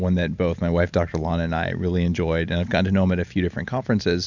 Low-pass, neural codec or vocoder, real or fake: 7.2 kHz; none; real